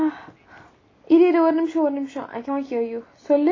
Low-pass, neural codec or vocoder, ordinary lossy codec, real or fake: 7.2 kHz; none; AAC, 32 kbps; real